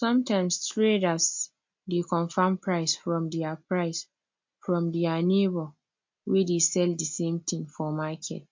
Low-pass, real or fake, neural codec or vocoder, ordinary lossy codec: 7.2 kHz; real; none; MP3, 48 kbps